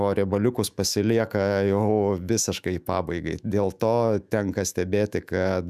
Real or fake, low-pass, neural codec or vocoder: fake; 14.4 kHz; autoencoder, 48 kHz, 128 numbers a frame, DAC-VAE, trained on Japanese speech